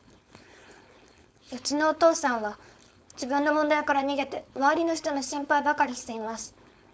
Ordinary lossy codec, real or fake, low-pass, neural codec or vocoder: none; fake; none; codec, 16 kHz, 4.8 kbps, FACodec